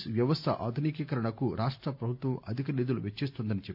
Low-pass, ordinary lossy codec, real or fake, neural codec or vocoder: 5.4 kHz; none; real; none